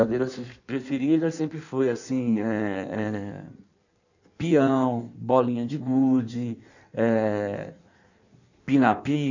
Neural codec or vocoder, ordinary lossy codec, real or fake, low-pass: codec, 16 kHz in and 24 kHz out, 1.1 kbps, FireRedTTS-2 codec; none; fake; 7.2 kHz